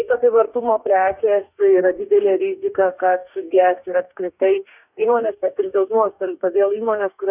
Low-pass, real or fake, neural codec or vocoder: 3.6 kHz; fake; codec, 44.1 kHz, 2.6 kbps, DAC